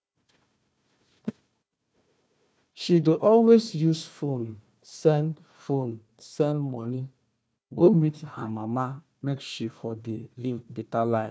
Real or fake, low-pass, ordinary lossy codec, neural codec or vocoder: fake; none; none; codec, 16 kHz, 1 kbps, FunCodec, trained on Chinese and English, 50 frames a second